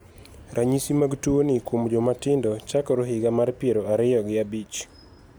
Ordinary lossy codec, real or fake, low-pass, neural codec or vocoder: none; real; none; none